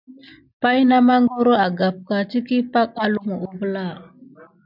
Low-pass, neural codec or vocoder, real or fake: 5.4 kHz; none; real